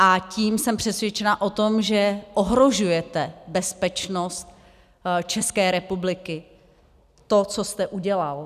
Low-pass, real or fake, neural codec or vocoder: 14.4 kHz; real; none